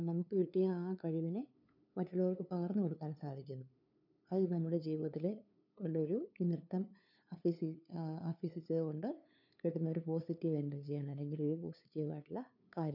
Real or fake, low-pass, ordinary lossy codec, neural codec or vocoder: fake; 5.4 kHz; none; codec, 16 kHz, 2 kbps, FunCodec, trained on LibriTTS, 25 frames a second